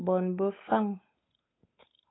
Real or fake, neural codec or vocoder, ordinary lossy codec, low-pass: fake; codec, 16 kHz, 8 kbps, FunCodec, trained on Chinese and English, 25 frames a second; AAC, 16 kbps; 7.2 kHz